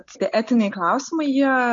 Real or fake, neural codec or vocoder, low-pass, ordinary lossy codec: real; none; 7.2 kHz; MP3, 48 kbps